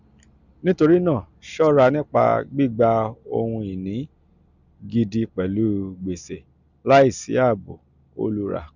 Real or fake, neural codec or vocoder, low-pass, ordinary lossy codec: real; none; 7.2 kHz; none